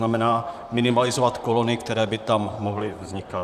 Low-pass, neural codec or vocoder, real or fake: 14.4 kHz; vocoder, 44.1 kHz, 128 mel bands, Pupu-Vocoder; fake